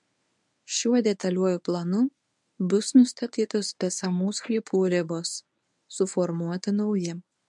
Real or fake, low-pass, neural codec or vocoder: fake; 10.8 kHz; codec, 24 kHz, 0.9 kbps, WavTokenizer, medium speech release version 1